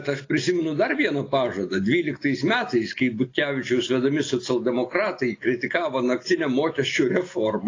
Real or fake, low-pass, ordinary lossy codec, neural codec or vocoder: real; 7.2 kHz; AAC, 32 kbps; none